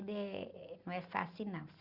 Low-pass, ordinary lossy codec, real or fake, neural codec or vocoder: 5.4 kHz; Opus, 64 kbps; real; none